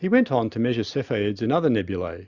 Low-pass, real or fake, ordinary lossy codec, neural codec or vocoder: 7.2 kHz; real; Opus, 64 kbps; none